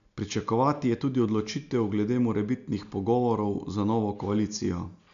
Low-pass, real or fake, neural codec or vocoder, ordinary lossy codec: 7.2 kHz; real; none; none